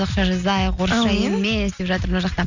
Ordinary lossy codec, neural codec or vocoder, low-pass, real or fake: none; none; 7.2 kHz; real